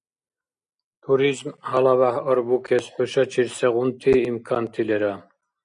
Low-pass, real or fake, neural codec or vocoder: 9.9 kHz; real; none